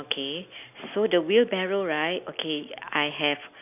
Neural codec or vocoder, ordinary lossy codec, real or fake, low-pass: none; none; real; 3.6 kHz